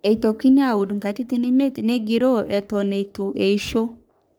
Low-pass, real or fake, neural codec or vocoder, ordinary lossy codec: none; fake; codec, 44.1 kHz, 3.4 kbps, Pupu-Codec; none